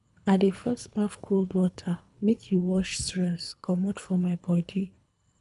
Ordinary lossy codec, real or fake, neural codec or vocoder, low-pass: none; fake; codec, 24 kHz, 3 kbps, HILCodec; 10.8 kHz